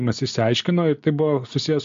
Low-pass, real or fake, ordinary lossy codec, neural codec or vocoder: 7.2 kHz; real; MP3, 48 kbps; none